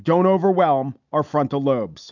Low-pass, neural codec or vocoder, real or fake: 7.2 kHz; none; real